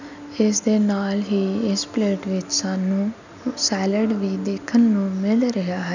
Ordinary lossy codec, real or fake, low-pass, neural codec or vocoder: none; real; 7.2 kHz; none